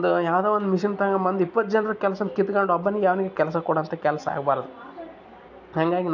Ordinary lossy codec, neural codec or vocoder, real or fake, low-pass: none; none; real; 7.2 kHz